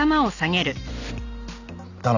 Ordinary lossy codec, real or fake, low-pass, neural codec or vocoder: none; real; 7.2 kHz; none